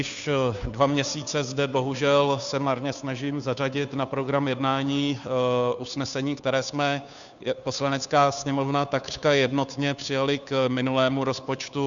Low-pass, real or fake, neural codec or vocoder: 7.2 kHz; fake; codec, 16 kHz, 2 kbps, FunCodec, trained on Chinese and English, 25 frames a second